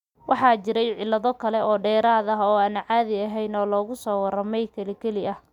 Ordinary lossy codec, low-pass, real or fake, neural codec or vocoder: none; 19.8 kHz; real; none